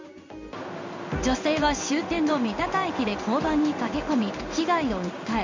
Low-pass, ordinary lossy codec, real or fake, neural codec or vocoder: 7.2 kHz; MP3, 48 kbps; fake; codec, 16 kHz in and 24 kHz out, 1 kbps, XY-Tokenizer